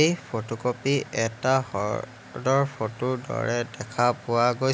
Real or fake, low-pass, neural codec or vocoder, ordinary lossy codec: real; none; none; none